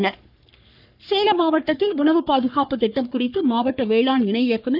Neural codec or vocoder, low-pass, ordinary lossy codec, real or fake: codec, 44.1 kHz, 3.4 kbps, Pupu-Codec; 5.4 kHz; none; fake